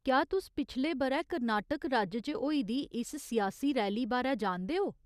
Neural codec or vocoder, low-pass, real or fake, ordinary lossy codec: none; 14.4 kHz; real; Opus, 64 kbps